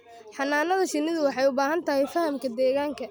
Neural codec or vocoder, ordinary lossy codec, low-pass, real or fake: none; none; none; real